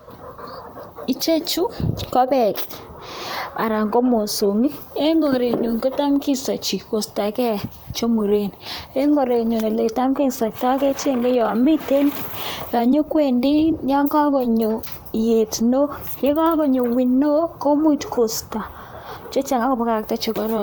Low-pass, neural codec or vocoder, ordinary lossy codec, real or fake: none; vocoder, 44.1 kHz, 128 mel bands, Pupu-Vocoder; none; fake